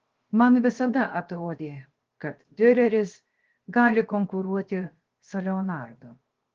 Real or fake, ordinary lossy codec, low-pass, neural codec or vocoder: fake; Opus, 16 kbps; 7.2 kHz; codec, 16 kHz, 0.7 kbps, FocalCodec